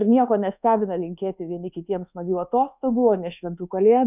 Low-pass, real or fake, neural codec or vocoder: 3.6 kHz; fake; codec, 24 kHz, 1.2 kbps, DualCodec